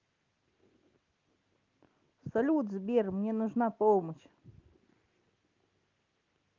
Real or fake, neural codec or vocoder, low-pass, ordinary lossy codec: real; none; 7.2 kHz; Opus, 32 kbps